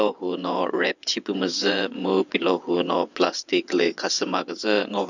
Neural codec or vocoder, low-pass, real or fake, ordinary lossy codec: vocoder, 22.05 kHz, 80 mel bands, WaveNeXt; 7.2 kHz; fake; none